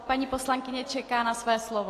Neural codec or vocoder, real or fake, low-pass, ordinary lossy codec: none; real; 14.4 kHz; AAC, 48 kbps